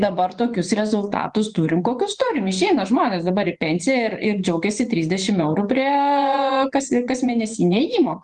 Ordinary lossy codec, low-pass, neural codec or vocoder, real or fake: Opus, 24 kbps; 10.8 kHz; vocoder, 24 kHz, 100 mel bands, Vocos; fake